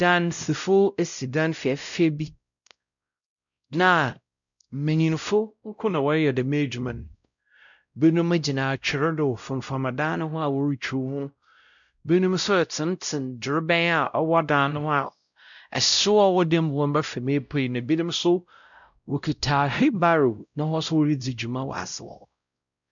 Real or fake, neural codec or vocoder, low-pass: fake; codec, 16 kHz, 0.5 kbps, X-Codec, WavLM features, trained on Multilingual LibriSpeech; 7.2 kHz